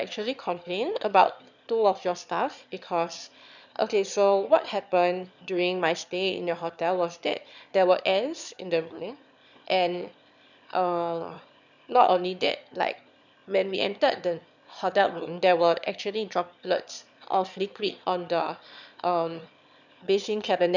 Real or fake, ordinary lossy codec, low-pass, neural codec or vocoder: fake; none; 7.2 kHz; autoencoder, 22.05 kHz, a latent of 192 numbers a frame, VITS, trained on one speaker